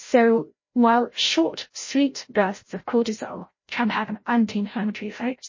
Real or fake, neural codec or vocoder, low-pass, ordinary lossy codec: fake; codec, 16 kHz, 0.5 kbps, FreqCodec, larger model; 7.2 kHz; MP3, 32 kbps